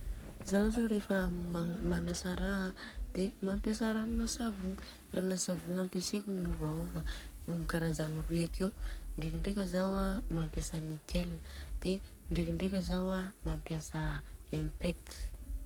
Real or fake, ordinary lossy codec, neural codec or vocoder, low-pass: fake; none; codec, 44.1 kHz, 3.4 kbps, Pupu-Codec; none